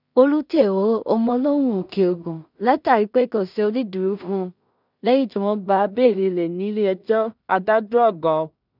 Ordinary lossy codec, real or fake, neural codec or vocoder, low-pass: none; fake; codec, 16 kHz in and 24 kHz out, 0.4 kbps, LongCat-Audio-Codec, two codebook decoder; 5.4 kHz